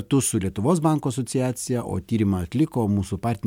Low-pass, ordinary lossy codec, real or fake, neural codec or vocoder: 19.8 kHz; MP3, 96 kbps; real; none